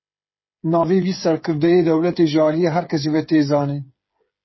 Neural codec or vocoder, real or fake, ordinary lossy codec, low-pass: codec, 16 kHz, 8 kbps, FreqCodec, smaller model; fake; MP3, 24 kbps; 7.2 kHz